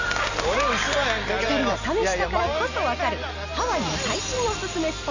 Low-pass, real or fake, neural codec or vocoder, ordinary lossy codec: 7.2 kHz; real; none; none